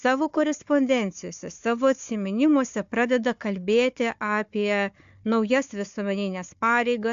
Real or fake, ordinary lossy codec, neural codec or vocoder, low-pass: fake; AAC, 64 kbps; codec, 16 kHz, 4 kbps, FunCodec, trained on Chinese and English, 50 frames a second; 7.2 kHz